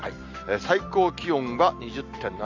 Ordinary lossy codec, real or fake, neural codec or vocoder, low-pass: none; real; none; 7.2 kHz